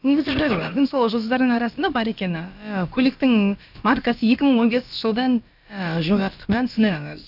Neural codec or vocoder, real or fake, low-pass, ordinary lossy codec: codec, 16 kHz, about 1 kbps, DyCAST, with the encoder's durations; fake; 5.4 kHz; none